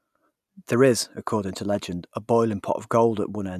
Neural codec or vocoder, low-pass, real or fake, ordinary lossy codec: none; 14.4 kHz; real; none